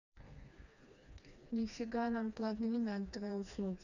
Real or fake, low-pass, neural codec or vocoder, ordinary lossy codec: fake; 7.2 kHz; codec, 16 kHz, 2 kbps, FreqCodec, smaller model; none